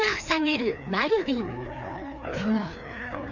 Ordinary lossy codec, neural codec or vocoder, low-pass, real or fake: none; codec, 16 kHz, 2 kbps, FreqCodec, larger model; 7.2 kHz; fake